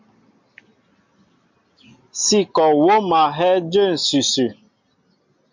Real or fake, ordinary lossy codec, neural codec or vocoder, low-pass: real; MP3, 48 kbps; none; 7.2 kHz